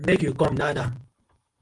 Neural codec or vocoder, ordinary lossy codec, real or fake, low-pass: vocoder, 44.1 kHz, 128 mel bands every 512 samples, BigVGAN v2; Opus, 24 kbps; fake; 10.8 kHz